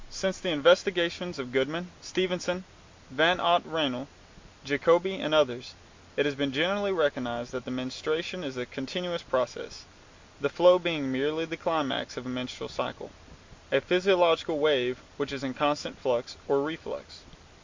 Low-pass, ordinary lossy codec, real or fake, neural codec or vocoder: 7.2 kHz; MP3, 48 kbps; real; none